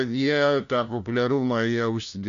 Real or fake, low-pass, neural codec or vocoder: fake; 7.2 kHz; codec, 16 kHz, 1 kbps, FunCodec, trained on LibriTTS, 50 frames a second